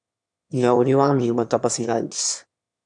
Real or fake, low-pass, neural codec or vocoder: fake; 9.9 kHz; autoencoder, 22.05 kHz, a latent of 192 numbers a frame, VITS, trained on one speaker